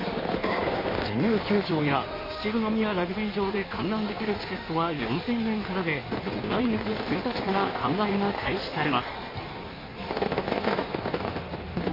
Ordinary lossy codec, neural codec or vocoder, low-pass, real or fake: MP3, 24 kbps; codec, 16 kHz in and 24 kHz out, 1.1 kbps, FireRedTTS-2 codec; 5.4 kHz; fake